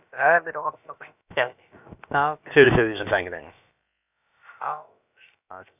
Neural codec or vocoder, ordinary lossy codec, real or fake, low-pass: codec, 16 kHz, about 1 kbps, DyCAST, with the encoder's durations; none; fake; 3.6 kHz